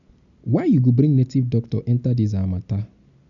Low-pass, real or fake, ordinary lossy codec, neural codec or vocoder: 7.2 kHz; real; none; none